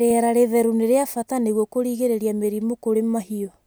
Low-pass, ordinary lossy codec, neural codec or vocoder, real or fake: none; none; none; real